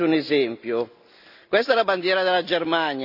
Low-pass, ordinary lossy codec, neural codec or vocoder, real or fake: 5.4 kHz; none; none; real